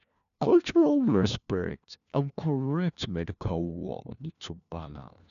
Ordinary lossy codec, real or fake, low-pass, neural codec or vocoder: none; fake; 7.2 kHz; codec, 16 kHz, 1 kbps, FunCodec, trained on LibriTTS, 50 frames a second